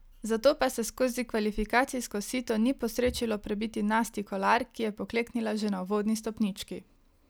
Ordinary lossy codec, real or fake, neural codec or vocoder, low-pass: none; real; none; none